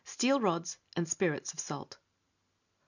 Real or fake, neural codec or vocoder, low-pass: real; none; 7.2 kHz